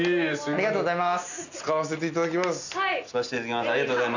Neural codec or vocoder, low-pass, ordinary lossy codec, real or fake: none; 7.2 kHz; none; real